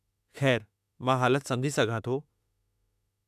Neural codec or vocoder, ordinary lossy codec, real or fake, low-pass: autoencoder, 48 kHz, 32 numbers a frame, DAC-VAE, trained on Japanese speech; none; fake; 14.4 kHz